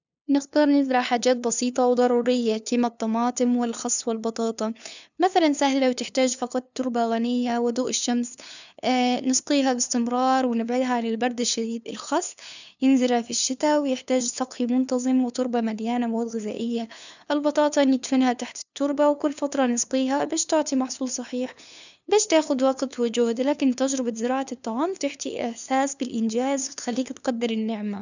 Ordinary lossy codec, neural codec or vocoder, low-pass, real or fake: none; codec, 16 kHz, 2 kbps, FunCodec, trained on LibriTTS, 25 frames a second; 7.2 kHz; fake